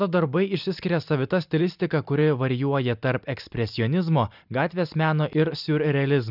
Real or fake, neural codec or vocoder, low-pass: real; none; 5.4 kHz